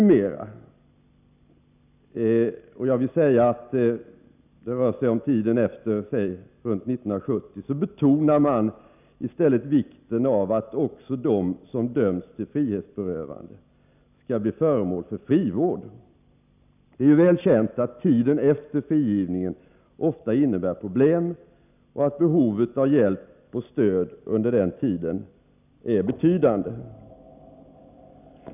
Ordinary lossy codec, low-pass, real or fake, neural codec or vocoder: none; 3.6 kHz; real; none